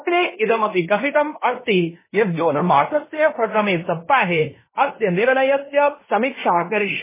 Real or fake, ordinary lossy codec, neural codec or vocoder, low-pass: fake; MP3, 16 kbps; codec, 16 kHz in and 24 kHz out, 0.9 kbps, LongCat-Audio-Codec, fine tuned four codebook decoder; 3.6 kHz